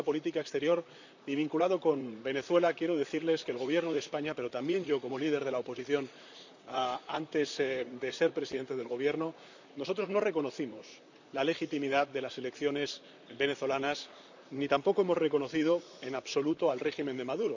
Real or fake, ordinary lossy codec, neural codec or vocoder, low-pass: fake; none; vocoder, 44.1 kHz, 128 mel bands, Pupu-Vocoder; 7.2 kHz